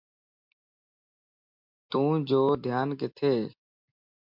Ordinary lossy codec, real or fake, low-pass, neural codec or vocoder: MP3, 48 kbps; real; 5.4 kHz; none